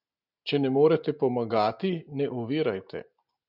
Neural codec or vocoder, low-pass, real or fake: none; 5.4 kHz; real